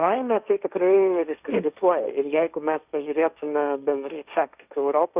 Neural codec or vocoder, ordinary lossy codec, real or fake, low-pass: codec, 16 kHz, 1.1 kbps, Voila-Tokenizer; Opus, 64 kbps; fake; 3.6 kHz